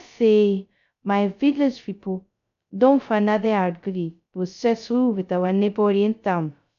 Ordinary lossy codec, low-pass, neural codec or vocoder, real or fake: none; 7.2 kHz; codec, 16 kHz, 0.2 kbps, FocalCodec; fake